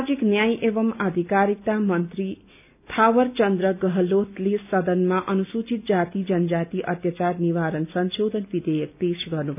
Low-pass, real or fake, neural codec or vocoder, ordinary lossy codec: 3.6 kHz; real; none; none